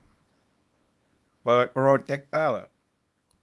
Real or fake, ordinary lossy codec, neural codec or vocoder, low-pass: fake; none; codec, 24 kHz, 0.9 kbps, WavTokenizer, small release; none